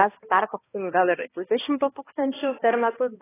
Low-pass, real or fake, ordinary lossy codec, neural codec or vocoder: 3.6 kHz; fake; AAC, 16 kbps; codec, 16 kHz, 1 kbps, X-Codec, HuBERT features, trained on balanced general audio